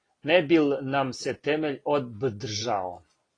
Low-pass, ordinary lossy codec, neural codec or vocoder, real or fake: 9.9 kHz; AAC, 32 kbps; none; real